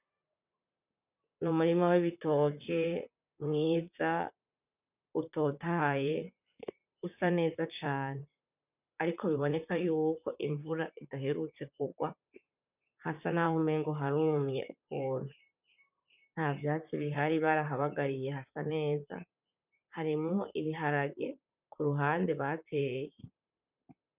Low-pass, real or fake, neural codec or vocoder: 3.6 kHz; fake; vocoder, 44.1 kHz, 128 mel bands, Pupu-Vocoder